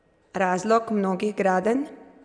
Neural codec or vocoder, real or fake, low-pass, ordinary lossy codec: none; real; 9.9 kHz; none